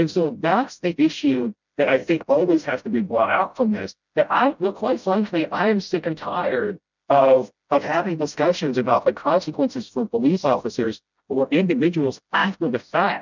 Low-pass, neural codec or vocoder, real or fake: 7.2 kHz; codec, 16 kHz, 0.5 kbps, FreqCodec, smaller model; fake